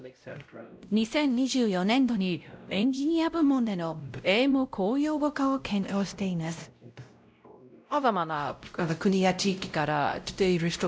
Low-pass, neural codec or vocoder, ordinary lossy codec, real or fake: none; codec, 16 kHz, 0.5 kbps, X-Codec, WavLM features, trained on Multilingual LibriSpeech; none; fake